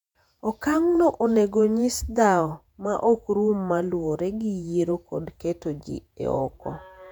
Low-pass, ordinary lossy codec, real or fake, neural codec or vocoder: 19.8 kHz; none; fake; vocoder, 48 kHz, 128 mel bands, Vocos